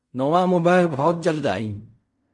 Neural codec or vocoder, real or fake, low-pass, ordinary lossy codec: codec, 16 kHz in and 24 kHz out, 0.4 kbps, LongCat-Audio-Codec, fine tuned four codebook decoder; fake; 10.8 kHz; MP3, 48 kbps